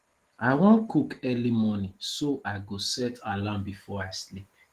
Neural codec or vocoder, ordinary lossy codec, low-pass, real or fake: none; Opus, 16 kbps; 14.4 kHz; real